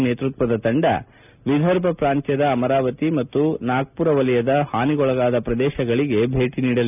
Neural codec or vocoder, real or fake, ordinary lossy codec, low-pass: none; real; none; 3.6 kHz